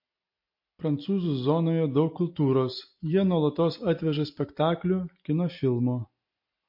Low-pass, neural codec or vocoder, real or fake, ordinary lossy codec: 5.4 kHz; none; real; MP3, 32 kbps